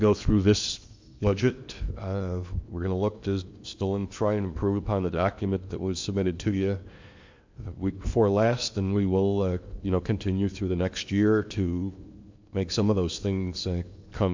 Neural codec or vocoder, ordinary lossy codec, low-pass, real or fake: codec, 16 kHz in and 24 kHz out, 0.8 kbps, FocalCodec, streaming, 65536 codes; MP3, 64 kbps; 7.2 kHz; fake